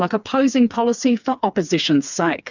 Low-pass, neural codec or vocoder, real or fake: 7.2 kHz; codec, 16 kHz, 4 kbps, FreqCodec, smaller model; fake